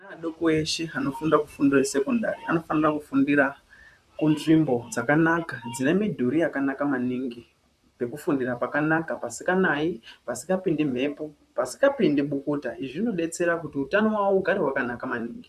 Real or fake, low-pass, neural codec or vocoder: fake; 14.4 kHz; vocoder, 44.1 kHz, 128 mel bands, Pupu-Vocoder